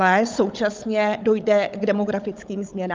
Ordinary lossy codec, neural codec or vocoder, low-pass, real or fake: Opus, 24 kbps; codec, 16 kHz, 16 kbps, FunCodec, trained on Chinese and English, 50 frames a second; 7.2 kHz; fake